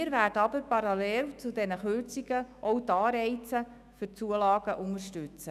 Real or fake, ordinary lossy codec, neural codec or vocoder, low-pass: fake; none; autoencoder, 48 kHz, 128 numbers a frame, DAC-VAE, trained on Japanese speech; 14.4 kHz